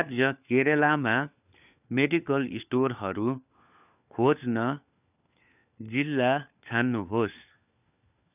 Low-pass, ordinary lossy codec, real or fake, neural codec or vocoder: 3.6 kHz; none; fake; codec, 16 kHz, 4 kbps, FunCodec, trained on LibriTTS, 50 frames a second